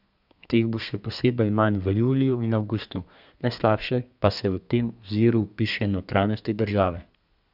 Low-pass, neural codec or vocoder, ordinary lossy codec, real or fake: 5.4 kHz; codec, 32 kHz, 1.9 kbps, SNAC; none; fake